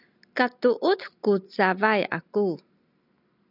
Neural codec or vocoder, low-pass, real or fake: none; 5.4 kHz; real